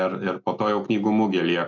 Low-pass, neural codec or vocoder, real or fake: 7.2 kHz; none; real